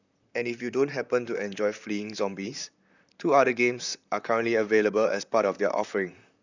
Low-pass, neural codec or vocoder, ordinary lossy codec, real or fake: 7.2 kHz; vocoder, 44.1 kHz, 128 mel bands, Pupu-Vocoder; none; fake